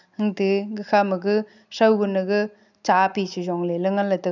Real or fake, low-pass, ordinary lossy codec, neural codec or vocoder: real; 7.2 kHz; none; none